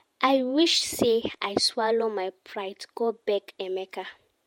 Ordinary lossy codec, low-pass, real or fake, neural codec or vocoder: MP3, 64 kbps; 19.8 kHz; fake; vocoder, 48 kHz, 128 mel bands, Vocos